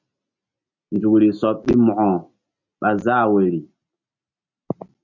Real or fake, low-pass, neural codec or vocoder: real; 7.2 kHz; none